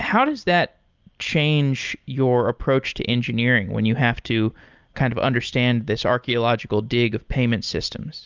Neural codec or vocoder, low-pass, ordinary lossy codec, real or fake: none; 7.2 kHz; Opus, 24 kbps; real